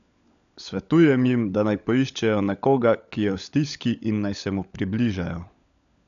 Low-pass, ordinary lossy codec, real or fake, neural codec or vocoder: 7.2 kHz; none; fake; codec, 16 kHz, 16 kbps, FunCodec, trained on LibriTTS, 50 frames a second